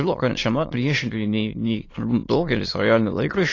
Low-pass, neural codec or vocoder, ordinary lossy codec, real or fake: 7.2 kHz; autoencoder, 22.05 kHz, a latent of 192 numbers a frame, VITS, trained on many speakers; AAC, 32 kbps; fake